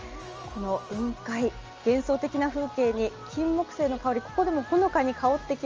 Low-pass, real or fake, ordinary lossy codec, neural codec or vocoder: 7.2 kHz; real; Opus, 24 kbps; none